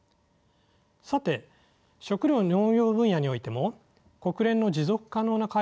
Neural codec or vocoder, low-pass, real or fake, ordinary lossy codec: none; none; real; none